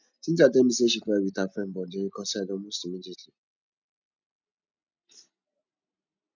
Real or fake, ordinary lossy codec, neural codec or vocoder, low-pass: real; none; none; 7.2 kHz